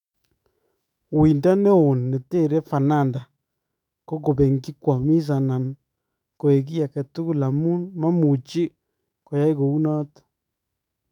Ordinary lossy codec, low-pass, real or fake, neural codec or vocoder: none; 19.8 kHz; fake; autoencoder, 48 kHz, 128 numbers a frame, DAC-VAE, trained on Japanese speech